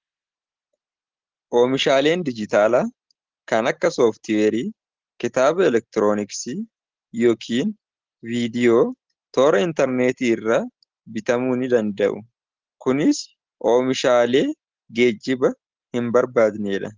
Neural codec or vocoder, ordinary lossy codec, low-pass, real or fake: none; Opus, 16 kbps; 7.2 kHz; real